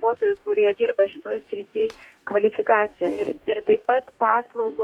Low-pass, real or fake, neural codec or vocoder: 19.8 kHz; fake; codec, 44.1 kHz, 2.6 kbps, DAC